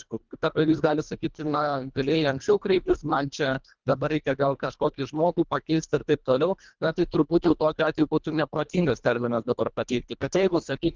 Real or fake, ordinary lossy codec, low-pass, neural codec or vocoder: fake; Opus, 32 kbps; 7.2 kHz; codec, 24 kHz, 1.5 kbps, HILCodec